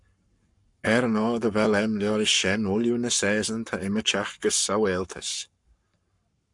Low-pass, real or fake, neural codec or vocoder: 10.8 kHz; fake; codec, 44.1 kHz, 7.8 kbps, Pupu-Codec